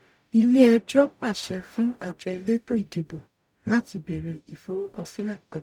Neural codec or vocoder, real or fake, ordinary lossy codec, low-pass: codec, 44.1 kHz, 0.9 kbps, DAC; fake; none; 19.8 kHz